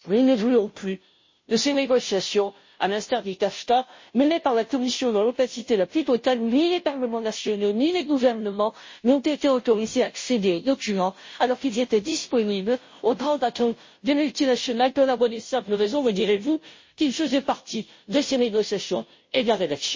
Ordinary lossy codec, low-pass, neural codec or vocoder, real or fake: MP3, 32 kbps; 7.2 kHz; codec, 16 kHz, 0.5 kbps, FunCodec, trained on Chinese and English, 25 frames a second; fake